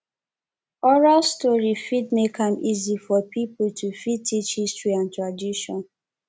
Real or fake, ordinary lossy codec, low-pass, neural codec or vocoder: real; none; none; none